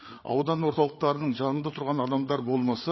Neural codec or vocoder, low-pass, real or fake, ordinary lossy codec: codec, 16 kHz, 8 kbps, FreqCodec, larger model; 7.2 kHz; fake; MP3, 24 kbps